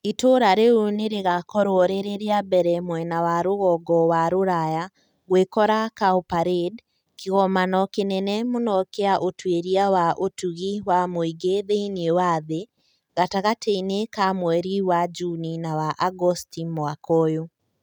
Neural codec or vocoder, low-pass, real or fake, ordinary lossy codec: none; 19.8 kHz; real; none